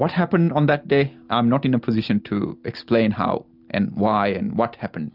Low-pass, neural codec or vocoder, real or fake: 5.4 kHz; none; real